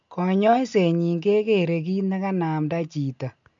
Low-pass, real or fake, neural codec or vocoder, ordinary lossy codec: 7.2 kHz; real; none; MP3, 64 kbps